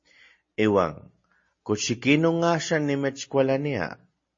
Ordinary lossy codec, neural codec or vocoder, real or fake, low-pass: MP3, 32 kbps; none; real; 7.2 kHz